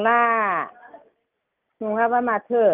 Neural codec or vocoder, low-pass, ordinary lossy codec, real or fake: none; 3.6 kHz; Opus, 24 kbps; real